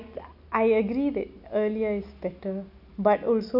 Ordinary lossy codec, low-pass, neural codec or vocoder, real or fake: none; 5.4 kHz; none; real